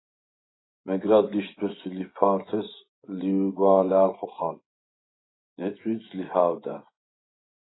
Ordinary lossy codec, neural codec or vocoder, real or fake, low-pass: AAC, 16 kbps; vocoder, 24 kHz, 100 mel bands, Vocos; fake; 7.2 kHz